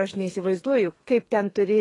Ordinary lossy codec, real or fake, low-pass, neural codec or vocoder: AAC, 32 kbps; fake; 10.8 kHz; codec, 32 kHz, 1.9 kbps, SNAC